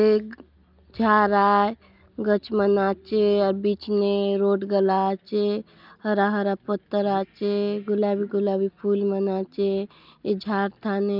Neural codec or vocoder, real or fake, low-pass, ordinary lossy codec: none; real; 5.4 kHz; Opus, 24 kbps